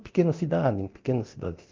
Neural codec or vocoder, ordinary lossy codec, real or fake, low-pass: codec, 24 kHz, 0.9 kbps, DualCodec; Opus, 32 kbps; fake; 7.2 kHz